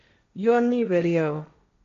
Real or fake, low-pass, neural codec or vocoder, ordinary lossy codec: fake; 7.2 kHz; codec, 16 kHz, 1.1 kbps, Voila-Tokenizer; MP3, 48 kbps